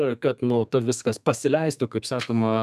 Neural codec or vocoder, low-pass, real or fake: codec, 32 kHz, 1.9 kbps, SNAC; 14.4 kHz; fake